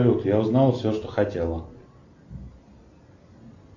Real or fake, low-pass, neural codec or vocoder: real; 7.2 kHz; none